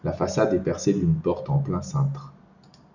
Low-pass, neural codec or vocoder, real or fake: 7.2 kHz; none; real